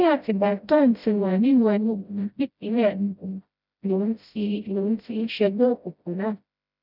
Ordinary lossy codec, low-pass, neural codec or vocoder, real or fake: none; 5.4 kHz; codec, 16 kHz, 0.5 kbps, FreqCodec, smaller model; fake